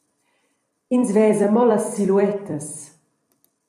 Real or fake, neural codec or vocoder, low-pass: fake; vocoder, 44.1 kHz, 128 mel bands every 256 samples, BigVGAN v2; 14.4 kHz